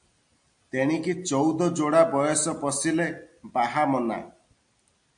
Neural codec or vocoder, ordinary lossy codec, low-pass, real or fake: none; MP3, 64 kbps; 9.9 kHz; real